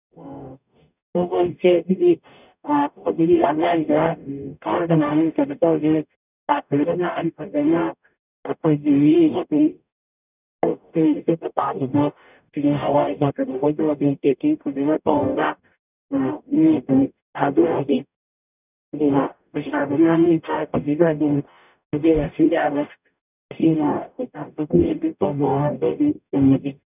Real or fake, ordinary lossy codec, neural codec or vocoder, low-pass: fake; none; codec, 44.1 kHz, 0.9 kbps, DAC; 3.6 kHz